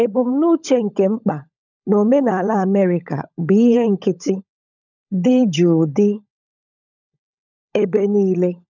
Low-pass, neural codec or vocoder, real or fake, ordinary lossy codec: 7.2 kHz; codec, 16 kHz, 16 kbps, FunCodec, trained on LibriTTS, 50 frames a second; fake; none